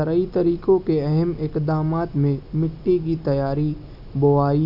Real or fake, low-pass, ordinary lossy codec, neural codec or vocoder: real; 5.4 kHz; none; none